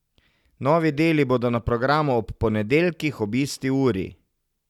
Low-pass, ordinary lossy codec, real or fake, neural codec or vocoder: 19.8 kHz; none; real; none